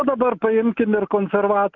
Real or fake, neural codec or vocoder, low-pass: real; none; 7.2 kHz